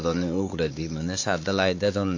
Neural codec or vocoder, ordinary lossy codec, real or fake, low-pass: codec, 16 kHz, 4 kbps, X-Codec, WavLM features, trained on Multilingual LibriSpeech; AAC, 48 kbps; fake; 7.2 kHz